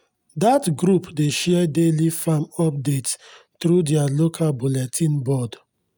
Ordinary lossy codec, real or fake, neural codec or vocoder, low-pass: none; real; none; none